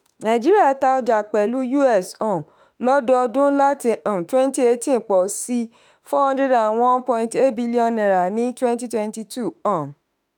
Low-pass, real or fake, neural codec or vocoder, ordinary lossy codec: none; fake; autoencoder, 48 kHz, 32 numbers a frame, DAC-VAE, trained on Japanese speech; none